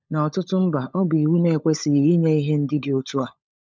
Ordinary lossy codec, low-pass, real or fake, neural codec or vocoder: none; none; fake; codec, 16 kHz, 16 kbps, FunCodec, trained on LibriTTS, 50 frames a second